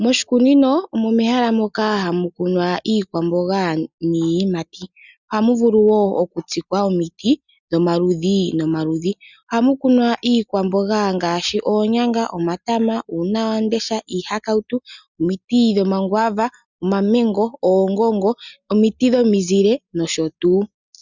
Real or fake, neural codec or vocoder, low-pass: real; none; 7.2 kHz